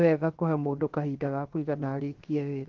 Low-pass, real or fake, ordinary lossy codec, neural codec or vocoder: 7.2 kHz; fake; Opus, 16 kbps; codec, 16 kHz, 0.7 kbps, FocalCodec